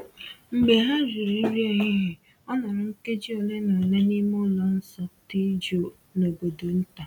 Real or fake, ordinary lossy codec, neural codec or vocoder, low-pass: real; none; none; 19.8 kHz